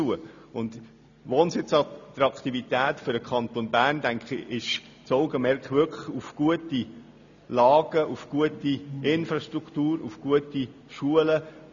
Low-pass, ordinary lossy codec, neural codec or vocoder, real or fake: 7.2 kHz; none; none; real